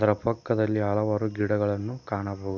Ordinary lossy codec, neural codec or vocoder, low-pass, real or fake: none; none; 7.2 kHz; real